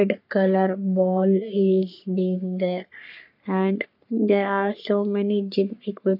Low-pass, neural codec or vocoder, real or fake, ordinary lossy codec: 5.4 kHz; codec, 44.1 kHz, 3.4 kbps, Pupu-Codec; fake; none